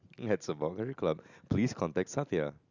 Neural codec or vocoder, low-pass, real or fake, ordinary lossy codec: none; 7.2 kHz; real; none